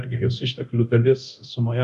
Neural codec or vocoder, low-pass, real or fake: codec, 24 kHz, 0.9 kbps, DualCodec; 10.8 kHz; fake